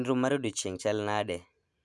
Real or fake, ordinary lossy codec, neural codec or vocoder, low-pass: real; none; none; none